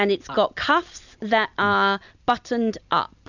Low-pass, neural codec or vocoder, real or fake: 7.2 kHz; none; real